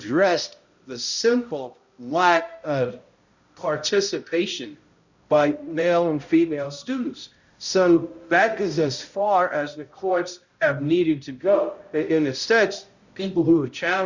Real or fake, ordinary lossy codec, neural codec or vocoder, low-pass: fake; Opus, 64 kbps; codec, 16 kHz, 0.5 kbps, X-Codec, HuBERT features, trained on balanced general audio; 7.2 kHz